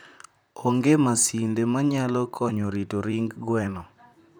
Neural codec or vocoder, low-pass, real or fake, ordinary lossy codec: vocoder, 44.1 kHz, 128 mel bands, Pupu-Vocoder; none; fake; none